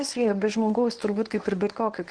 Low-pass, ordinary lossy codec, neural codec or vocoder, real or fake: 9.9 kHz; Opus, 16 kbps; codec, 24 kHz, 0.9 kbps, WavTokenizer, medium speech release version 1; fake